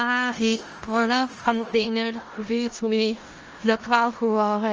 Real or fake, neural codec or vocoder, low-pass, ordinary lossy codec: fake; codec, 16 kHz in and 24 kHz out, 0.4 kbps, LongCat-Audio-Codec, four codebook decoder; 7.2 kHz; Opus, 24 kbps